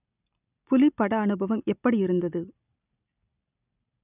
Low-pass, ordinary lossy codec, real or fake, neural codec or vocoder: 3.6 kHz; none; real; none